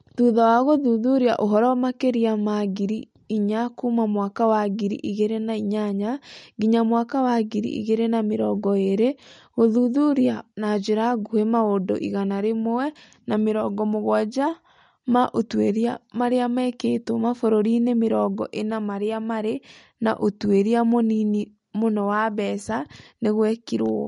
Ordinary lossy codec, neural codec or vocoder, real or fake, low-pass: MP3, 48 kbps; none; real; 10.8 kHz